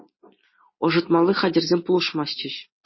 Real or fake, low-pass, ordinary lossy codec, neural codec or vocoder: real; 7.2 kHz; MP3, 24 kbps; none